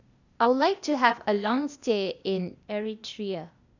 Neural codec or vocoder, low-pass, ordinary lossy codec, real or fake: codec, 16 kHz, 0.8 kbps, ZipCodec; 7.2 kHz; none; fake